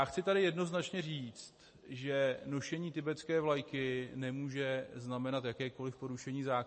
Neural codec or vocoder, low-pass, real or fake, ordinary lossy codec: none; 10.8 kHz; real; MP3, 32 kbps